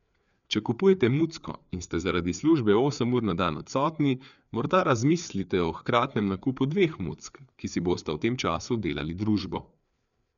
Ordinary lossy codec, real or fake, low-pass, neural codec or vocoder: none; fake; 7.2 kHz; codec, 16 kHz, 4 kbps, FreqCodec, larger model